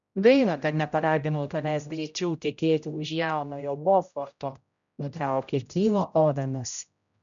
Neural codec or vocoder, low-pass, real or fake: codec, 16 kHz, 0.5 kbps, X-Codec, HuBERT features, trained on general audio; 7.2 kHz; fake